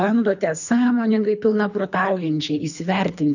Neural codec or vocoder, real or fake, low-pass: codec, 24 kHz, 3 kbps, HILCodec; fake; 7.2 kHz